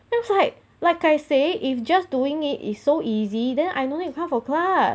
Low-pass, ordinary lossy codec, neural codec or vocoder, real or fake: none; none; none; real